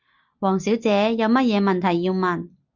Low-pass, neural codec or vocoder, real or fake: 7.2 kHz; none; real